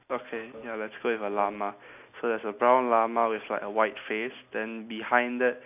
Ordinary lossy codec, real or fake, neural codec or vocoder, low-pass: none; real; none; 3.6 kHz